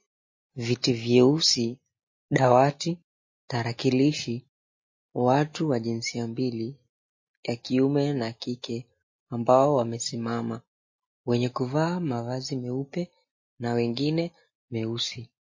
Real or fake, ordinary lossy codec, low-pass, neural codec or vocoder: real; MP3, 32 kbps; 7.2 kHz; none